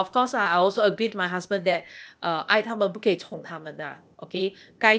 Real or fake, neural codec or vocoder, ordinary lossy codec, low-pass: fake; codec, 16 kHz, 0.8 kbps, ZipCodec; none; none